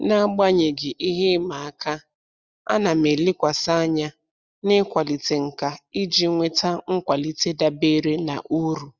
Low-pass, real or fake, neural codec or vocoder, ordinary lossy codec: 7.2 kHz; real; none; Opus, 64 kbps